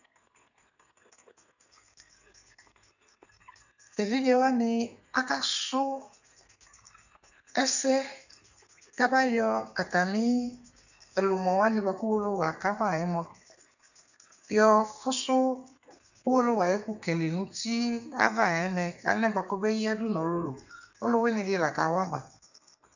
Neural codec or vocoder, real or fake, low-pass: codec, 32 kHz, 1.9 kbps, SNAC; fake; 7.2 kHz